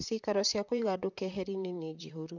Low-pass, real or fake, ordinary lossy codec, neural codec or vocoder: 7.2 kHz; fake; none; vocoder, 44.1 kHz, 128 mel bands, Pupu-Vocoder